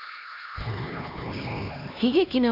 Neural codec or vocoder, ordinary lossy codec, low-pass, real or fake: codec, 16 kHz, 2 kbps, X-Codec, HuBERT features, trained on LibriSpeech; none; 5.4 kHz; fake